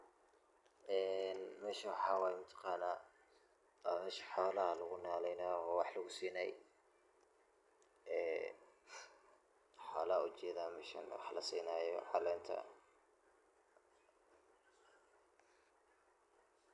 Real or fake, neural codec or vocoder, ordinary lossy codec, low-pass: real; none; none; 10.8 kHz